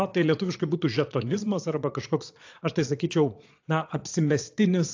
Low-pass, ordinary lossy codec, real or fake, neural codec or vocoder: 7.2 kHz; AAC, 48 kbps; fake; vocoder, 22.05 kHz, 80 mel bands, Vocos